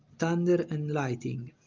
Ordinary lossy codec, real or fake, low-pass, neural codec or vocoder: Opus, 32 kbps; real; 7.2 kHz; none